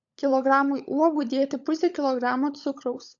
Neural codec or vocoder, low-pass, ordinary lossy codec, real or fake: codec, 16 kHz, 16 kbps, FunCodec, trained on LibriTTS, 50 frames a second; 7.2 kHz; AAC, 64 kbps; fake